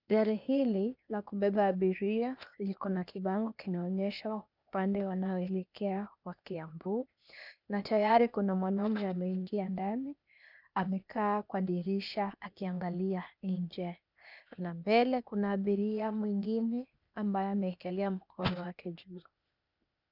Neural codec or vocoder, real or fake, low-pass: codec, 16 kHz, 0.8 kbps, ZipCodec; fake; 5.4 kHz